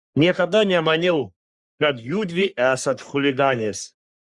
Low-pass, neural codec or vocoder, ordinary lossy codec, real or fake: 10.8 kHz; codec, 44.1 kHz, 3.4 kbps, Pupu-Codec; Opus, 64 kbps; fake